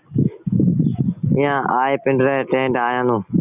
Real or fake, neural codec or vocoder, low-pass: real; none; 3.6 kHz